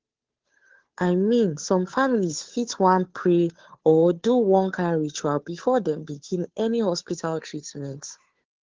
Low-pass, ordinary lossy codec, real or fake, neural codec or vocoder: 7.2 kHz; Opus, 16 kbps; fake; codec, 16 kHz, 2 kbps, FunCodec, trained on Chinese and English, 25 frames a second